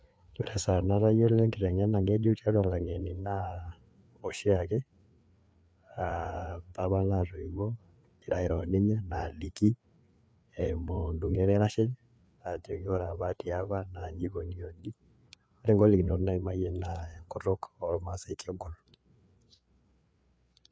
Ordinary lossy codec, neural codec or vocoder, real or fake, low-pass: none; codec, 16 kHz, 4 kbps, FreqCodec, larger model; fake; none